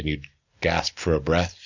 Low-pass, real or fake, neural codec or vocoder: 7.2 kHz; real; none